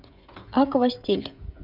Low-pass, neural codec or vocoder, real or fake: 5.4 kHz; codec, 16 kHz, 16 kbps, FreqCodec, smaller model; fake